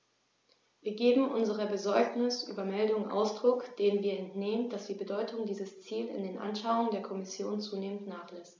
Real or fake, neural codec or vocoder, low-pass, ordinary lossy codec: real; none; none; none